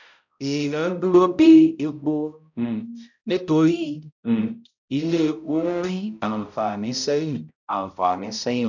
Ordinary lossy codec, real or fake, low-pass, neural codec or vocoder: none; fake; 7.2 kHz; codec, 16 kHz, 0.5 kbps, X-Codec, HuBERT features, trained on balanced general audio